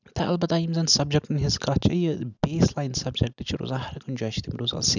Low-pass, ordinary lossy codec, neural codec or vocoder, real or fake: 7.2 kHz; none; none; real